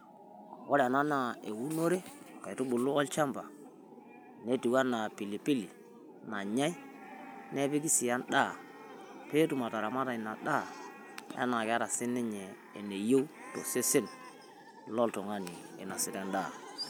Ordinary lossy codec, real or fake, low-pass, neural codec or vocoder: none; real; none; none